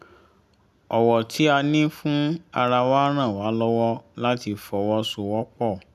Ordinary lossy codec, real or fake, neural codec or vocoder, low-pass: none; real; none; 14.4 kHz